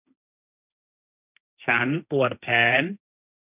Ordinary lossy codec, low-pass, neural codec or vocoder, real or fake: none; 3.6 kHz; codec, 16 kHz, 1.1 kbps, Voila-Tokenizer; fake